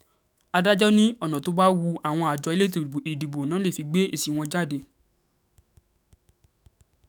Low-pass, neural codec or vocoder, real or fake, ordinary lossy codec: none; autoencoder, 48 kHz, 128 numbers a frame, DAC-VAE, trained on Japanese speech; fake; none